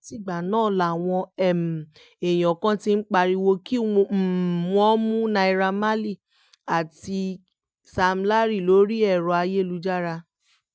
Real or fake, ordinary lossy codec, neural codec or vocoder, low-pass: real; none; none; none